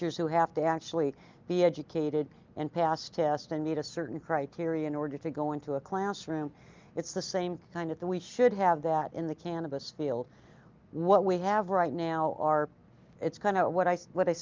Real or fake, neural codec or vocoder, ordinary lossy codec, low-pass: real; none; Opus, 24 kbps; 7.2 kHz